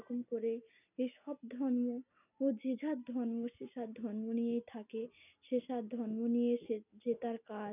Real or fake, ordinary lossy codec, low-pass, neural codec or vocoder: real; none; 3.6 kHz; none